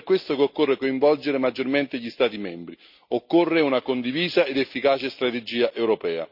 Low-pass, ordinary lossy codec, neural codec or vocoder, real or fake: 5.4 kHz; none; none; real